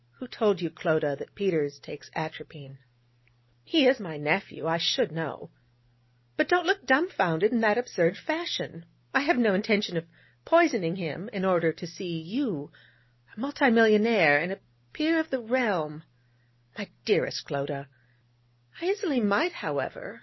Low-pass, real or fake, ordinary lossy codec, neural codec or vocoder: 7.2 kHz; real; MP3, 24 kbps; none